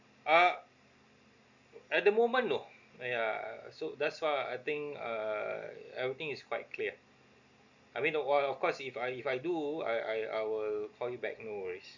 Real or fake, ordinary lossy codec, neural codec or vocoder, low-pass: real; Opus, 64 kbps; none; 7.2 kHz